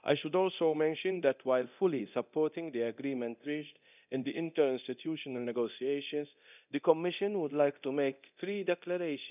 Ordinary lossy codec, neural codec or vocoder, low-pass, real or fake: none; codec, 24 kHz, 0.9 kbps, DualCodec; 3.6 kHz; fake